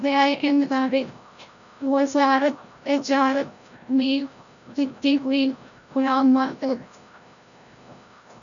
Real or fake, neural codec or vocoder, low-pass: fake; codec, 16 kHz, 0.5 kbps, FreqCodec, larger model; 7.2 kHz